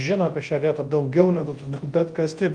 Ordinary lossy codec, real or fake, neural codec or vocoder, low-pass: Opus, 24 kbps; fake; codec, 24 kHz, 0.9 kbps, WavTokenizer, large speech release; 9.9 kHz